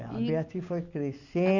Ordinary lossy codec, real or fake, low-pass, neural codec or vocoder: none; real; 7.2 kHz; none